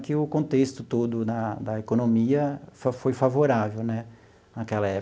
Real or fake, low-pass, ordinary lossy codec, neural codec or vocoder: real; none; none; none